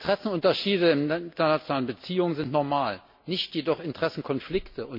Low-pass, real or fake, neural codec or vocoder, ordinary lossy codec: 5.4 kHz; real; none; MP3, 32 kbps